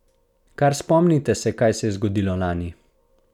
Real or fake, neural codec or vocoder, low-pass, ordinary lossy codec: real; none; 19.8 kHz; none